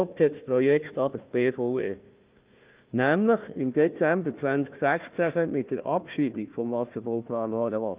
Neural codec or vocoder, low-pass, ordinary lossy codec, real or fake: codec, 16 kHz, 1 kbps, FunCodec, trained on Chinese and English, 50 frames a second; 3.6 kHz; Opus, 24 kbps; fake